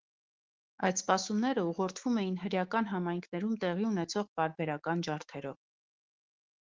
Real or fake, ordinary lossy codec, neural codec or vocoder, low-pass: fake; Opus, 16 kbps; autoencoder, 48 kHz, 128 numbers a frame, DAC-VAE, trained on Japanese speech; 7.2 kHz